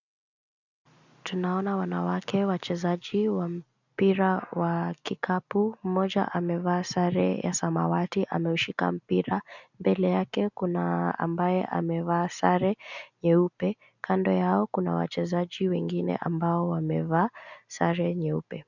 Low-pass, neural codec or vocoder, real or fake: 7.2 kHz; none; real